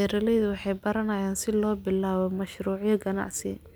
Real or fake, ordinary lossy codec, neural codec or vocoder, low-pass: real; none; none; none